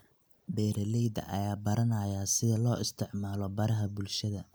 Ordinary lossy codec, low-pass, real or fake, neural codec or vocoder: none; none; real; none